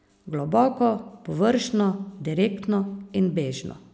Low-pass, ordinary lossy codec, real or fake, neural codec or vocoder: none; none; real; none